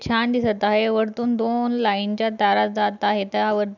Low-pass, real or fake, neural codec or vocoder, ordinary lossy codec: 7.2 kHz; real; none; none